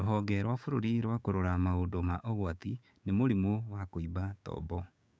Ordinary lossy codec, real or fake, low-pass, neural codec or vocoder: none; fake; none; codec, 16 kHz, 6 kbps, DAC